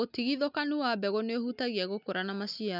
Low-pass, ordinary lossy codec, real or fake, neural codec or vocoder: 5.4 kHz; none; real; none